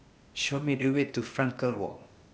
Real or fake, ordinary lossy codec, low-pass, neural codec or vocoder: fake; none; none; codec, 16 kHz, 0.8 kbps, ZipCodec